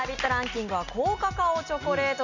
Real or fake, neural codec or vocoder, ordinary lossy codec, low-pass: real; none; none; 7.2 kHz